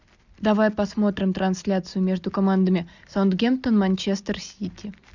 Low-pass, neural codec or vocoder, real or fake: 7.2 kHz; none; real